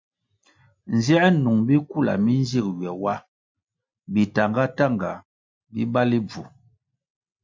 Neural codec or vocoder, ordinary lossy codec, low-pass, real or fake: none; MP3, 64 kbps; 7.2 kHz; real